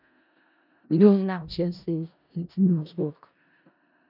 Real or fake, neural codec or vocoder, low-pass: fake; codec, 16 kHz in and 24 kHz out, 0.4 kbps, LongCat-Audio-Codec, four codebook decoder; 5.4 kHz